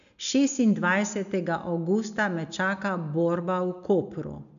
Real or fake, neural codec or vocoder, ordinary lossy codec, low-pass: real; none; none; 7.2 kHz